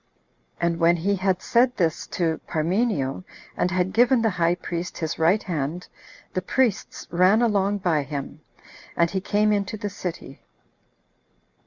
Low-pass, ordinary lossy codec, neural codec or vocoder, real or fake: 7.2 kHz; Opus, 64 kbps; none; real